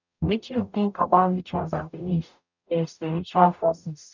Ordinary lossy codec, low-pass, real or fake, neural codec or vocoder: none; 7.2 kHz; fake; codec, 44.1 kHz, 0.9 kbps, DAC